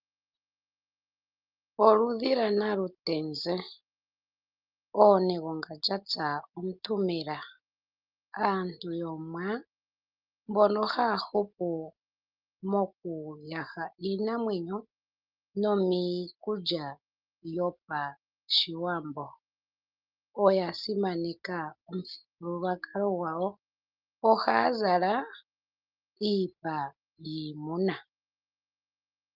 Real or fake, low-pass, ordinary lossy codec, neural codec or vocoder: real; 5.4 kHz; Opus, 24 kbps; none